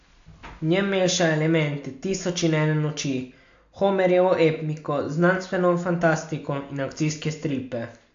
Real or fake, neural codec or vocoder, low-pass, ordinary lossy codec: real; none; 7.2 kHz; AAC, 64 kbps